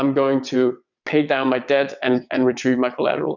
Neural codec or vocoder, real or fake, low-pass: vocoder, 22.05 kHz, 80 mel bands, WaveNeXt; fake; 7.2 kHz